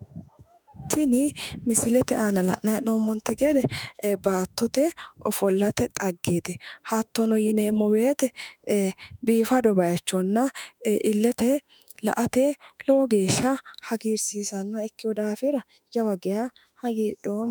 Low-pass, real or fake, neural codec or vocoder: 19.8 kHz; fake; autoencoder, 48 kHz, 32 numbers a frame, DAC-VAE, trained on Japanese speech